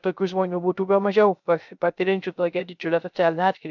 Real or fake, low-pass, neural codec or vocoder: fake; 7.2 kHz; codec, 16 kHz, 0.3 kbps, FocalCodec